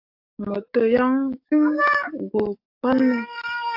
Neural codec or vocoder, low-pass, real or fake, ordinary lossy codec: codec, 44.1 kHz, 7.8 kbps, DAC; 5.4 kHz; fake; AAC, 48 kbps